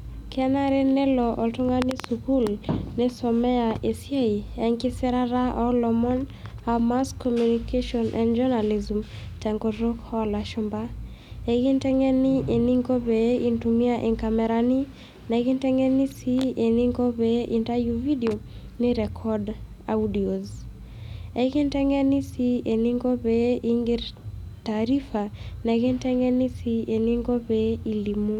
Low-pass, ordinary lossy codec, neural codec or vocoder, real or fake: 19.8 kHz; none; none; real